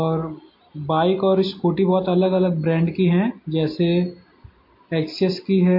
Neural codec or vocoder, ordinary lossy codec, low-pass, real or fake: none; MP3, 32 kbps; 5.4 kHz; real